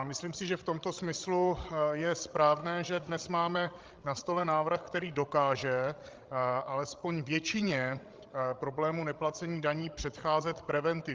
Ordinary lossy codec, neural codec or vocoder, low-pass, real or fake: Opus, 32 kbps; codec, 16 kHz, 16 kbps, FreqCodec, larger model; 7.2 kHz; fake